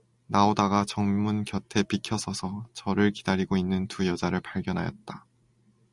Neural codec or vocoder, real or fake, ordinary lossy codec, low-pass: none; real; Opus, 64 kbps; 10.8 kHz